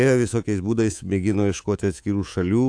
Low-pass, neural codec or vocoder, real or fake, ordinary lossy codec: 9.9 kHz; codec, 24 kHz, 3.1 kbps, DualCodec; fake; AAC, 64 kbps